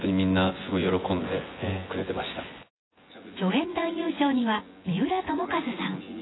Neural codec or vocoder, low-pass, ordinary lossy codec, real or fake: vocoder, 24 kHz, 100 mel bands, Vocos; 7.2 kHz; AAC, 16 kbps; fake